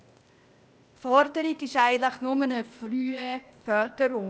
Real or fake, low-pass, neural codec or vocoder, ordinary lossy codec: fake; none; codec, 16 kHz, 0.8 kbps, ZipCodec; none